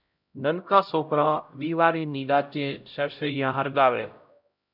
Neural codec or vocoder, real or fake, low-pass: codec, 16 kHz, 0.5 kbps, X-Codec, HuBERT features, trained on LibriSpeech; fake; 5.4 kHz